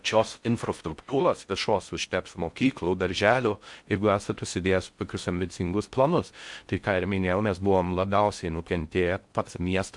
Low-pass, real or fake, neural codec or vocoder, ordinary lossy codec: 10.8 kHz; fake; codec, 16 kHz in and 24 kHz out, 0.6 kbps, FocalCodec, streaming, 4096 codes; MP3, 64 kbps